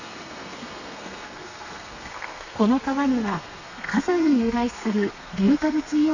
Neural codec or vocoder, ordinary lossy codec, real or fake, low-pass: codec, 32 kHz, 1.9 kbps, SNAC; none; fake; 7.2 kHz